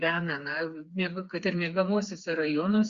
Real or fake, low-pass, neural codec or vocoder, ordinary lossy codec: fake; 7.2 kHz; codec, 16 kHz, 4 kbps, FreqCodec, smaller model; Opus, 64 kbps